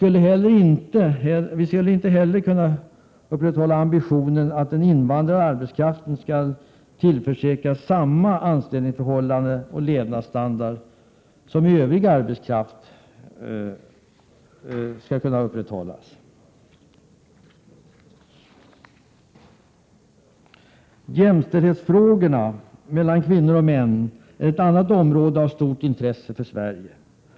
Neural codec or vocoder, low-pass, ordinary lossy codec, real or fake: none; none; none; real